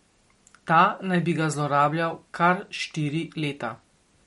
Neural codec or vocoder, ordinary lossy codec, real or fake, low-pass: none; MP3, 48 kbps; real; 19.8 kHz